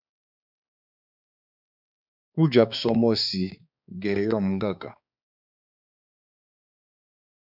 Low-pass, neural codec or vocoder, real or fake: 5.4 kHz; codec, 16 kHz, 4 kbps, X-Codec, HuBERT features, trained on balanced general audio; fake